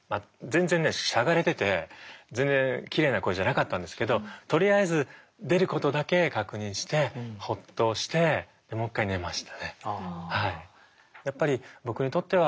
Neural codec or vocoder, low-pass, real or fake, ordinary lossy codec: none; none; real; none